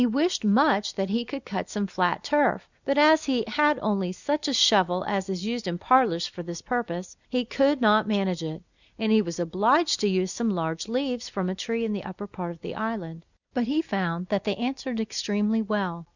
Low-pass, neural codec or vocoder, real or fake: 7.2 kHz; none; real